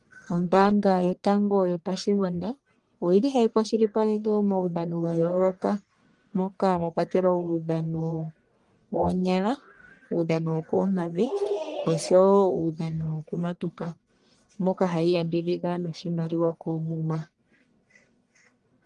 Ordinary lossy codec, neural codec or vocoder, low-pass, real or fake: Opus, 24 kbps; codec, 44.1 kHz, 1.7 kbps, Pupu-Codec; 10.8 kHz; fake